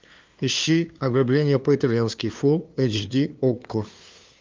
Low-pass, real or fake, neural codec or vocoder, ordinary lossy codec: 7.2 kHz; fake; codec, 16 kHz, 2 kbps, FunCodec, trained on LibriTTS, 25 frames a second; Opus, 24 kbps